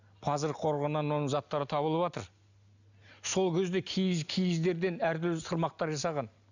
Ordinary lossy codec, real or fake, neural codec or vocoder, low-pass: none; real; none; 7.2 kHz